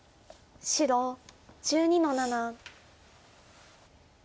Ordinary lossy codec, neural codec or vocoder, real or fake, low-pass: none; none; real; none